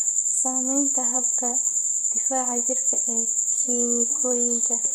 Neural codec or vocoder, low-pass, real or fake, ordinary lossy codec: vocoder, 44.1 kHz, 128 mel bands, Pupu-Vocoder; none; fake; none